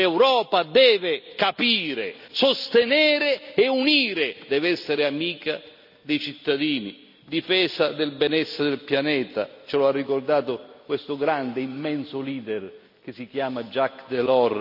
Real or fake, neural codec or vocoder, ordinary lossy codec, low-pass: real; none; none; 5.4 kHz